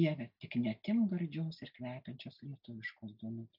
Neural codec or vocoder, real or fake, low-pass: none; real; 5.4 kHz